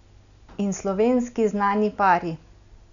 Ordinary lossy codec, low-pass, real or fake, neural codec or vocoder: none; 7.2 kHz; real; none